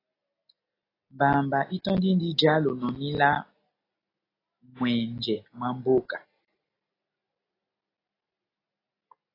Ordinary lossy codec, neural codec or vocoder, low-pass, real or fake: AAC, 24 kbps; none; 5.4 kHz; real